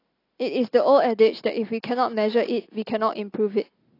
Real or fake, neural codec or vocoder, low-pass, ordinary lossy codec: real; none; 5.4 kHz; AAC, 32 kbps